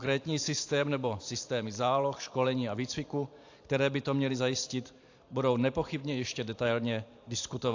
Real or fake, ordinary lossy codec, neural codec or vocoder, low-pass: fake; AAC, 48 kbps; vocoder, 44.1 kHz, 128 mel bands every 512 samples, BigVGAN v2; 7.2 kHz